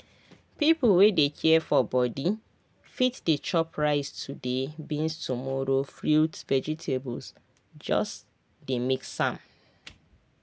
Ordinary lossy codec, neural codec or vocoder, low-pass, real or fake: none; none; none; real